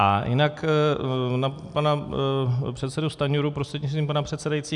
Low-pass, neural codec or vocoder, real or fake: 10.8 kHz; none; real